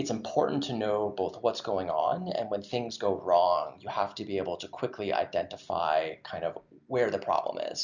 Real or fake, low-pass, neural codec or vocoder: real; 7.2 kHz; none